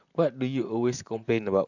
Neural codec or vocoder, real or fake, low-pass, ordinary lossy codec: vocoder, 44.1 kHz, 128 mel bands, Pupu-Vocoder; fake; 7.2 kHz; none